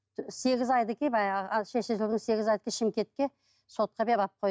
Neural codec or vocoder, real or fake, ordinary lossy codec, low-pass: none; real; none; none